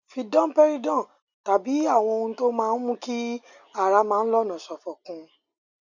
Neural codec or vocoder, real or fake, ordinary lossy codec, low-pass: none; real; none; 7.2 kHz